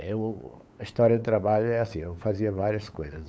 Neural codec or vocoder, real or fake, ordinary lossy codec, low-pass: codec, 16 kHz, 8 kbps, FunCodec, trained on LibriTTS, 25 frames a second; fake; none; none